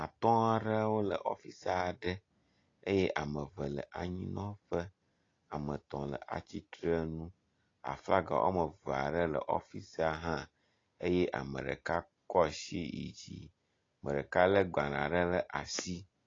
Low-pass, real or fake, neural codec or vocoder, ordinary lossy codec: 7.2 kHz; real; none; AAC, 32 kbps